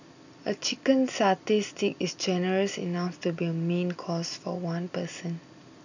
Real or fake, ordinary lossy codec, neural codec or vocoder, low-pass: real; none; none; 7.2 kHz